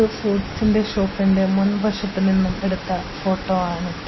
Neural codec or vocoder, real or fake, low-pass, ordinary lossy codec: none; real; 7.2 kHz; MP3, 24 kbps